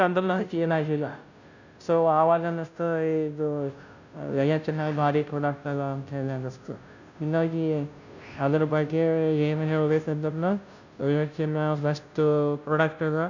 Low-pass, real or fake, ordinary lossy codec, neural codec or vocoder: 7.2 kHz; fake; none; codec, 16 kHz, 0.5 kbps, FunCodec, trained on Chinese and English, 25 frames a second